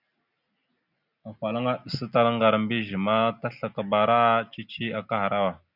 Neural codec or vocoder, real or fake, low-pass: none; real; 5.4 kHz